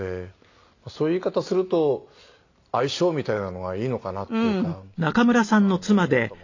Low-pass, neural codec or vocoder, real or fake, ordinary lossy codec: 7.2 kHz; none; real; AAC, 48 kbps